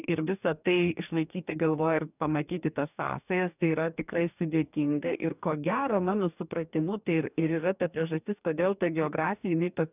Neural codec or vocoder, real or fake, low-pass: codec, 44.1 kHz, 2.6 kbps, DAC; fake; 3.6 kHz